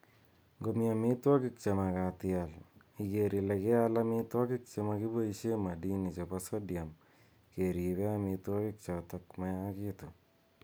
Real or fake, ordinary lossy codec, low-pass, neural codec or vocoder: real; none; none; none